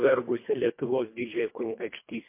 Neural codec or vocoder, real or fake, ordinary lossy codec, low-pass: codec, 24 kHz, 1.5 kbps, HILCodec; fake; MP3, 24 kbps; 3.6 kHz